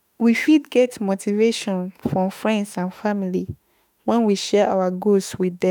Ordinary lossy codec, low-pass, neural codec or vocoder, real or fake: none; none; autoencoder, 48 kHz, 32 numbers a frame, DAC-VAE, trained on Japanese speech; fake